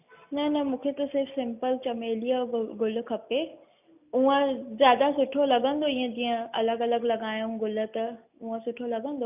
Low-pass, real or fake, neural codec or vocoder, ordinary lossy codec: 3.6 kHz; real; none; none